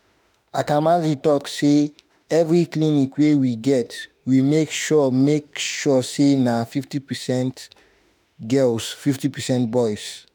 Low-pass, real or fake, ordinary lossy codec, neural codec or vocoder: none; fake; none; autoencoder, 48 kHz, 32 numbers a frame, DAC-VAE, trained on Japanese speech